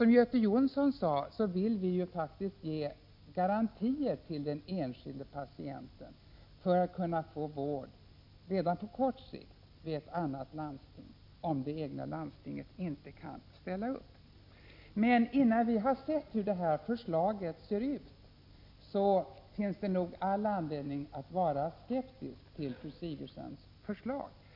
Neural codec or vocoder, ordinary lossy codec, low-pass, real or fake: none; MP3, 48 kbps; 5.4 kHz; real